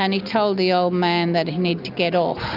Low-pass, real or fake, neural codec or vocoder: 5.4 kHz; real; none